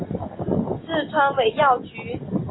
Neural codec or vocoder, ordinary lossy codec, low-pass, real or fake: none; AAC, 16 kbps; 7.2 kHz; real